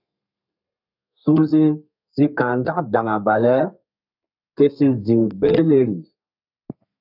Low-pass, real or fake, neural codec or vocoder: 5.4 kHz; fake; codec, 32 kHz, 1.9 kbps, SNAC